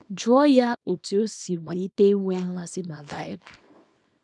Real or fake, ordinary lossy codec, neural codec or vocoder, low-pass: fake; none; codec, 24 kHz, 0.9 kbps, WavTokenizer, small release; 10.8 kHz